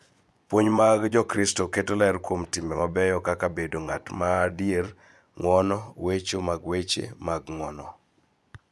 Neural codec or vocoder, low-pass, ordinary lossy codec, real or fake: vocoder, 24 kHz, 100 mel bands, Vocos; none; none; fake